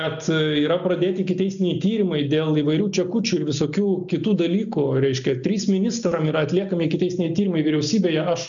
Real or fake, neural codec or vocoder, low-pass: real; none; 7.2 kHz